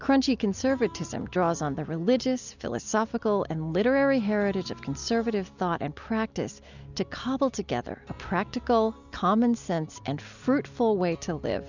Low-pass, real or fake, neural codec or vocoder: 7.2 kHz; real; none